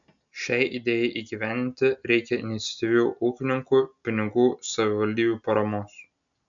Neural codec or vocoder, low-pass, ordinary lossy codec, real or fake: none; 7.2 kHz; MP3, 96 kbps; real